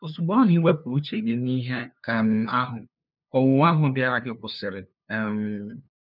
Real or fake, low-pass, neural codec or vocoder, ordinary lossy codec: fake; 5.4 kHz; codec, 16 kHz, 2 kbps, FunCodec, trained on LibriTTS, 25 frames a second; none